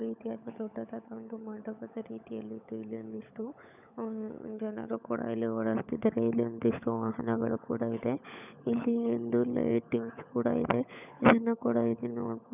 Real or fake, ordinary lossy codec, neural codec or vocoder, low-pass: fake; none; codec, 16 kHz, 16 kbps, FunCodec, trained on LibriTTS, 50 frames a second; 3.6 kHz